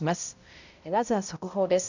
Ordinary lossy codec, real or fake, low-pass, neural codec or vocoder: none; fake; 7.2 kHz; codec, 16 kHz, 0.5 kbps, X-Codec, HuBERT features, trained on balanced general audio